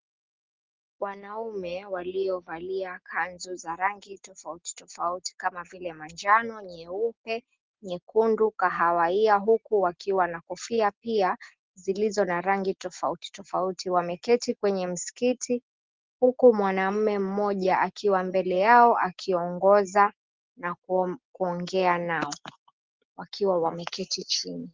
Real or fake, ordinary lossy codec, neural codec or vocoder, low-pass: real; Opus, 16 kbps; none; 7.2 kHz